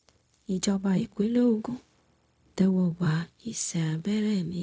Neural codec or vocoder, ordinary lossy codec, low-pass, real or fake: codec, 16 kHz, 0.4 kbps, LongCat-Audio-Codec; none; none; fake